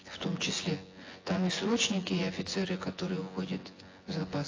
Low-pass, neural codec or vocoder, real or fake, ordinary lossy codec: 7.2 kHz; vocoder, 24 kHz, 100 mel bands, Vocos; fake; AAC, 32 kbps